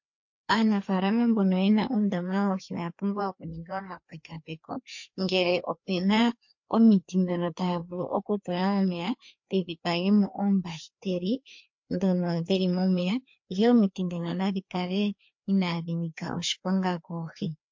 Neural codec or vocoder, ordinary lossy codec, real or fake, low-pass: codec, 16 kHz, 2 kbps, FreqCodec, larger model; MP3, 48 kbps; fake; 7.2 kHz